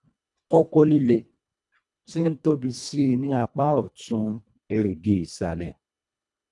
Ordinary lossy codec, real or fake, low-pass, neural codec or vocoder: none; fake; 10.8 kHz; codec, 24 kHz, 1.5 kbps, HILCodec